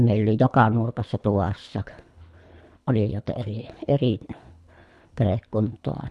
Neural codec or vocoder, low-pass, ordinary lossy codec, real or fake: codec, 24 kHz, 3 kbps, HILCodec; none; none; fake